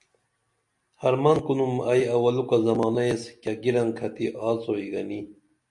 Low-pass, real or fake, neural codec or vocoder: 10.8 kHz; real; none